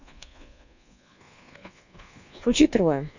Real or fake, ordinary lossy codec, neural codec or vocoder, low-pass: fake; none; codec, 24 kHz, 1.2 kbps, DualCodec; 7.2 kHz